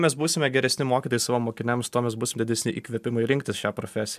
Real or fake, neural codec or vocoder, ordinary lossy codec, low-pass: real; none; MP3, 96 kbps; 14.4 kHz